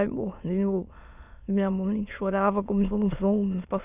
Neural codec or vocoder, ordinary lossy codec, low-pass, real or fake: autoencoder, 22.05 kHz, a latent of 192 numbers a frame, VITS, trained on many speakers; none; 3.6 kHz; fake